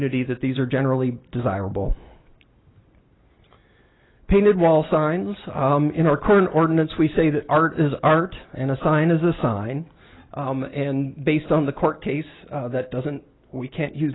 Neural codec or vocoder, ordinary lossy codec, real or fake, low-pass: none; AAC, 16 kbps; real; 7.2 kHz